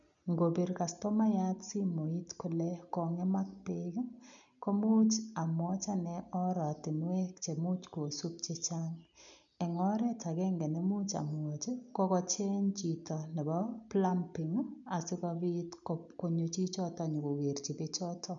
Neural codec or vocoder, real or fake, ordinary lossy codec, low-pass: none; real; none; 7.2 kHz